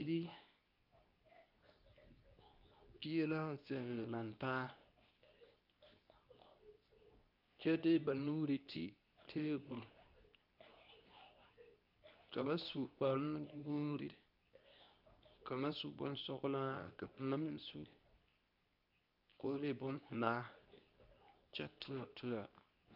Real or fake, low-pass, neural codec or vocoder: fake; 5.4 kHz; codec, 24 kHz, 0.9 kbps, WavTokenizer, medium speech release version 2